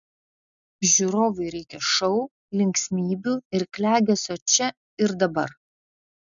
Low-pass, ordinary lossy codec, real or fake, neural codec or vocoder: 7.2 kHz; MP3, 96 kbps; real; none